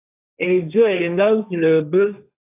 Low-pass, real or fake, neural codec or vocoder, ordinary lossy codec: 3.6 kHz; fake; codec, 16 kHz, 1.1 kbps, Voila-Tokenizer; AAC, 32 kbps